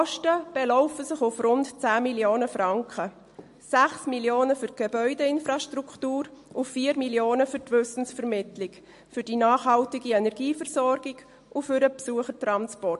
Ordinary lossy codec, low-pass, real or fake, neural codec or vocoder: MP3, 48 kbps; 14.4 kHz; real; none